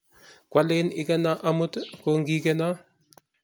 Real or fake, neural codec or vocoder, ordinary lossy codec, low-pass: real; none; none; none